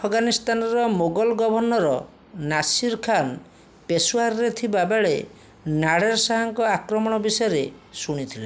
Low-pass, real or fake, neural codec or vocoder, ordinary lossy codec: none; real; none; none